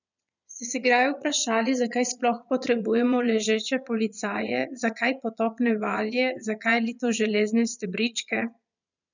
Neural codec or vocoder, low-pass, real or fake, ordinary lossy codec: vocoder, 22.05 kHz, 80 mel bands, Vocos; 7.2 kHz; fake; none